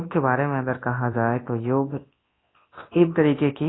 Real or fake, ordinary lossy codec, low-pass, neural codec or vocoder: fake; AAC, 16 kbps; 7.2 kHz; codec, 24 kHz, 0.9 kbps, WavTokenizer, large speech release